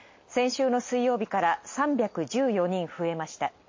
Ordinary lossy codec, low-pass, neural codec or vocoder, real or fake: MP3, 32 kbps; 7.2 kHz; none; real